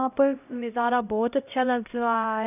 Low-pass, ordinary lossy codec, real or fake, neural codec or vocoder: 3.6 kHz; none; fake; codec, 16 kHz, 0.5 kbps, X-Codec, HuBERT features, trained on LibriSpeech